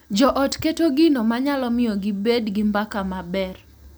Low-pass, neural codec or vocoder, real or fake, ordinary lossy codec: none; none; real; none